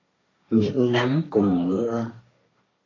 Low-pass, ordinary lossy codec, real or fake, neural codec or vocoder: 7.2 kHz; AAC, 32 kbps; fake; codec, 16 kHz, 1.1 kbps, Voila-Tokenizer